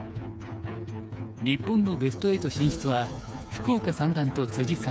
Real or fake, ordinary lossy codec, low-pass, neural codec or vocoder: fake; none; none; codec, 16 kHz, 4 kbps, FreqCodec, smaller model